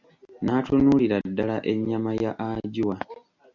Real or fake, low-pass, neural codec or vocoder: real; 7.2 kHz; none